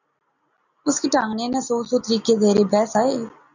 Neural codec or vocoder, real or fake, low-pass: none; real; 7.2 kHz